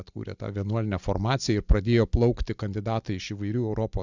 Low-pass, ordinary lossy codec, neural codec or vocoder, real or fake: 7.2 kHz; Opus, 64 kbps; none; real